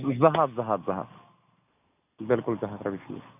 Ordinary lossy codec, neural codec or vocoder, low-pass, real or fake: none; none; 3.6 kHz; real